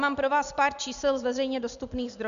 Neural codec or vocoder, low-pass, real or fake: none; 7.2 kHz; real